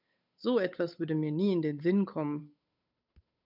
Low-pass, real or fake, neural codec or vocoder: 5.4 kHz; fake; codec, 16 kHz, 8 kbps, FunCodec, trained on Chinese and English, 25 frames a second